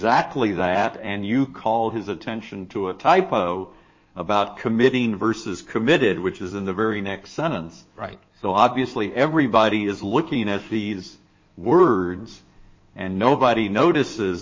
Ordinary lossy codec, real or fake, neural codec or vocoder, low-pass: MP3, 32 kbps; fake; codec, 16 kHz in and 24 kHz out, 2.2 kbps, FireRedTTS-2 codec; 7.2 kHz